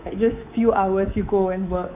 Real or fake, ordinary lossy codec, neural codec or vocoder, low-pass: fake; AAC, 32 kbps; codec, 24 kHz, 3.1 kbps, DualCodec; 3.6 kHz